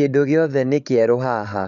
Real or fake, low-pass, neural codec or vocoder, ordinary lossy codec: fake; 7.2 kHz; codec, 16 kHz, 16 kbps, FreqCodec, larger model; none